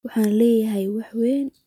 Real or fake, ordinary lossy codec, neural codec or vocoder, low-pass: real; none; none; 19.8 kHz